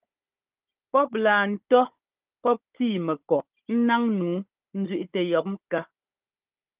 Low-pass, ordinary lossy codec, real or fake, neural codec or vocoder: 3.6 kHz; Opus, 24 kbps; fake; codec, 16 kHz, 16 kbps, FunCodec, trained on Chinese and English, 50 frames a second